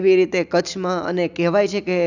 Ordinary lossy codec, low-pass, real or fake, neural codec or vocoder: none; 7.2 kHz; real; none